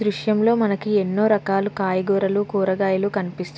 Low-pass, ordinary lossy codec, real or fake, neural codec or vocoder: none; none; real; none